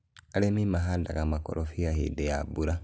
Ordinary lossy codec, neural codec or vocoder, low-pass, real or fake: none; none; none; real